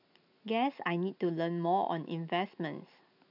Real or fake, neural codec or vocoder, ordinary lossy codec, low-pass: real; none; none; 5.4 kHz